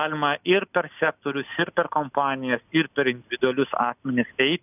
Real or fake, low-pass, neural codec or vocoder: real; 3.6 kHz; none